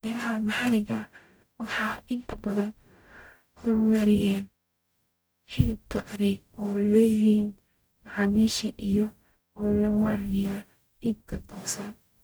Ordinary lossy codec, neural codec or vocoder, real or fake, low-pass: none; codec, 44.1 kHz, 0.9 kbps, DAC; fake; none